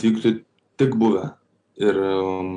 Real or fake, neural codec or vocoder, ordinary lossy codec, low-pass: real; none; AAC, 64 kbps; 9.9 kHz